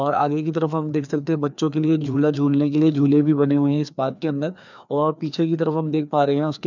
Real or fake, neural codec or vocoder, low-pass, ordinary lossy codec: fake; codec, 16 kHz, 2 kbps, FreqCodec, larger model; 7.2 kHz; none